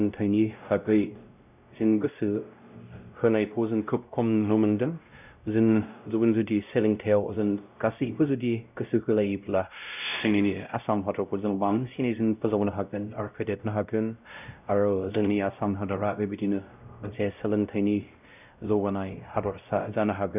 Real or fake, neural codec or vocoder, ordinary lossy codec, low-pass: fake; codec, 16 kHz, 0.5 kbps, X-Codec, WavLM features, trained on Multilingual LibriSpeech; none; 3.6 kHz